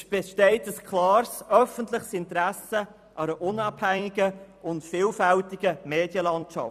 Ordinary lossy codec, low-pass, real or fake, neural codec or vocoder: none; 14.4 kHz; fake; vocoder, 44.1 kHz, 128 mel bands every 256 samples, BigVGAN v2